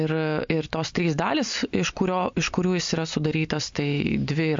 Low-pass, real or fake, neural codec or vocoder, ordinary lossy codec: 7.2 kHz; real; none; MP3, 48 kbps